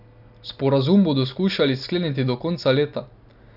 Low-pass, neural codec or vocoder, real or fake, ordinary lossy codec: 5.4 kHz; none; real; none